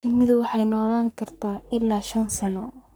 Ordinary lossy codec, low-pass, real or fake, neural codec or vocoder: none; none; fake; codec, 44.1 kHz, 3.4 kbps, Pupu-Codec